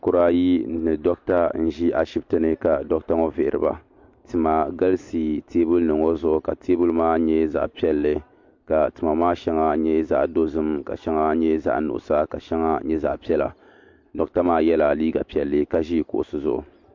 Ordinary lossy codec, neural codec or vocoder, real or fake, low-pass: MP3, 48 kbps; none; real; 7.2 kHz